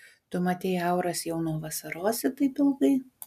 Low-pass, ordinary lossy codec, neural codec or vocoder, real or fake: 14.4 kHz; MP3, 96 kbps; none; real